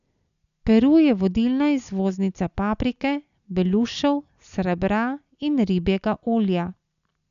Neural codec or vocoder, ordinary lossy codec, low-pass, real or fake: none; none; 7.2 kHz; real